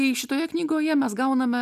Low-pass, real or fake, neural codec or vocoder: 14.4 kHz; real; none